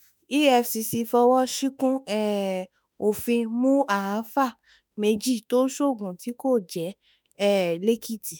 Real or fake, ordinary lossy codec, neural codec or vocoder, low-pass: fake; none; autoencoder, 48 kHz, 32 numbers a frame, DAC-VAE, trained on Japanese speech; none